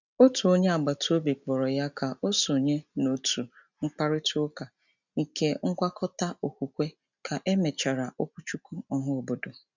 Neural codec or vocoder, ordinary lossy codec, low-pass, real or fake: none; none; 7.2 kHz; real